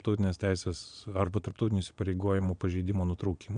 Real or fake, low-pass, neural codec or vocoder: fake; 9.9 kHz; vocoder, 22.05 kHz, 80 mel bands, Vocos